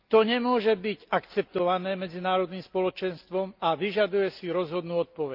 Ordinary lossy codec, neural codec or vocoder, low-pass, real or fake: Opus, 24 kbps; none; 5.4 kHz; real